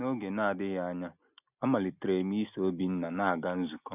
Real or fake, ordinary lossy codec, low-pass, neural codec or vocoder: real; none; 3.6 kHz; none